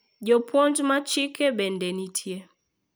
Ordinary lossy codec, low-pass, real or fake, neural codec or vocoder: none; none; real; none